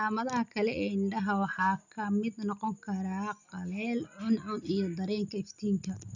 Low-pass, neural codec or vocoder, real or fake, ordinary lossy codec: 7.2 kHz; none; real; none